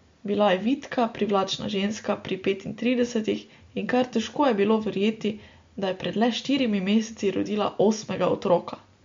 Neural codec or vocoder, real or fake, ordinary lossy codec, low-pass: none; real; MP3, 48 kbps; 7.2 kHz